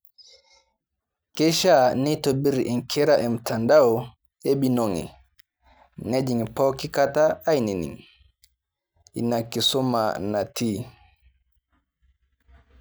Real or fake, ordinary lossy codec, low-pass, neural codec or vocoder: real; none; none; none